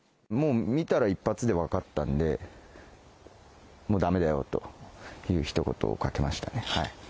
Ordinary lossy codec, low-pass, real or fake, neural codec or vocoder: none; none; real; none